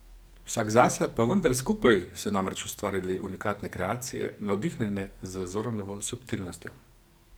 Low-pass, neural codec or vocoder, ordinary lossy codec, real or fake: none; codec, 44.1 kHz, 2.6 kbps, SNAC; none; fake